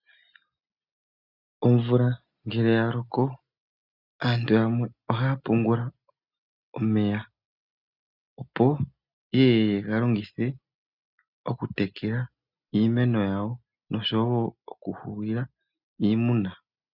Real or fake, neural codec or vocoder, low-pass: real; none; 5.4 kHz